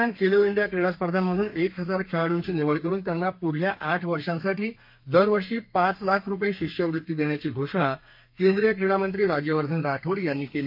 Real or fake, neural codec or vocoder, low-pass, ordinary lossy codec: fake; codec, 32 kHz, 1.9 kbps, SNAC; 5.4 kHz; MP3, 32 kbps